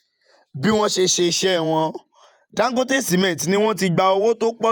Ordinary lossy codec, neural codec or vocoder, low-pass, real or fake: none; vocoder, 48 kHz, 128 mel bands, Vocos; 19.8 kHz; fake